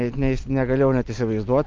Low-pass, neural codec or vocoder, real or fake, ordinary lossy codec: 7.2 kHz; none; real; Opus, 24 kbps